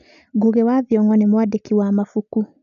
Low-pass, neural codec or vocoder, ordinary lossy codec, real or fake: 7.2 kHz; none; none; real